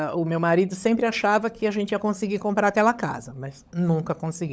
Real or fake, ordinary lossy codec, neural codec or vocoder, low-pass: fake; none; codec, 16 kHz, 16 kbps, FunCodec, trained on LibriTTS, 50 frames a second; none